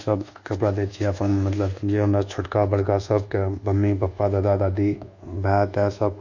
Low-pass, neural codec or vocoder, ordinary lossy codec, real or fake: 7.2 kHz; codec, 24 kHz, 1.2 kbps, DualCodec; none; fake